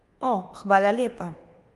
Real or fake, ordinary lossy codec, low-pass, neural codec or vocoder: fake; Opus, 24 kbps; 10.8 kHz; codec, 24 kHz, 1.2 kbps, DualCodec